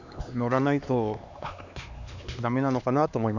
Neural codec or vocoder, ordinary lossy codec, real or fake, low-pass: codec, 16 kHz, 2 kbps, X-Codec, HuBERT features, trained on LibriSpeech; none; fake; 7.2 kHz